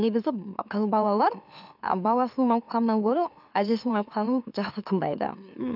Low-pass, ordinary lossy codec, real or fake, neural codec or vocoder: 5.4 kHz; none; fake; autoencoder, 44.1 kHz, a latent of 192 numbers a frame, MeloTTS